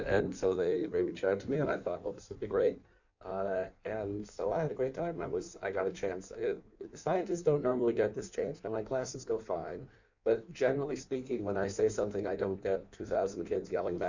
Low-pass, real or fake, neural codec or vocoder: 7.2 kHz; fake; codec, 16 kHz in and 24 kHz out, 1.1 kbps, FireRedTTS-2 codec